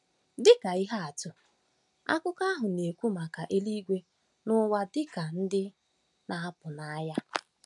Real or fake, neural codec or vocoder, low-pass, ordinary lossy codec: real; none; 10.8 kHz; none